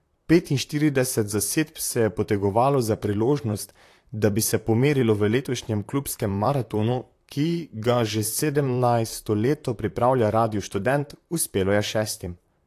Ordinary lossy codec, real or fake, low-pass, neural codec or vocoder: AAC, 64 kbps; fake; 14.4 kHz; vocoder, 44.1 kHz, 128 mel bands, Pupu-Vocoder